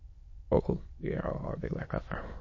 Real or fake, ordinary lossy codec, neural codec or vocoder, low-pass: fake; MP3, 32 kbps; autoencoder, 22.05 kHz, a latent of 192 numbers a frame, VITS, trained on many speakers; 7.2 kHz